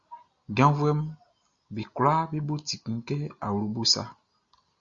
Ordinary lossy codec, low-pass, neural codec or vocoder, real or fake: Opus, 64 kbps; 7.2 kHz; none; real